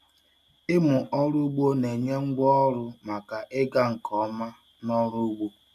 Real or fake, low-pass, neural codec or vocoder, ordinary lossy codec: real; 14.4 kHz; none; none